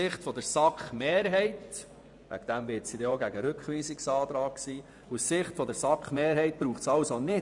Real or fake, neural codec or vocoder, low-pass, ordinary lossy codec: fake; vocoder, 24 kHz, 100 mel bands, Vocos; 10.8 kHz; none